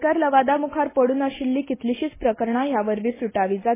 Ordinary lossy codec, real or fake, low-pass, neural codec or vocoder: MP3, 16 kbps; real; 3.6 kHz; none